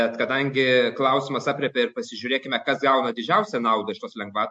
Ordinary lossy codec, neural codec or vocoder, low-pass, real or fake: MP3, 48 kbps; none; 10.8 kHz; real